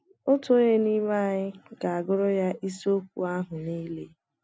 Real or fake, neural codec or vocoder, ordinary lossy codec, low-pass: real; none; none; none